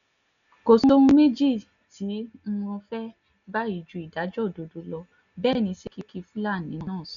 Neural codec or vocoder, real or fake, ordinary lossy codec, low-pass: none; real; none; 7.2 kHz